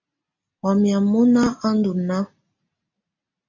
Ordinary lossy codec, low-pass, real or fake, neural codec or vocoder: MP3, 64 kbps; 7.2 kHz; real; none